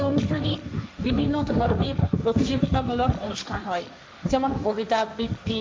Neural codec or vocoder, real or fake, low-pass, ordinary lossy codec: codec, 16 kHz, 1.1 kbps, Voila-Tokenizer; fake; none; none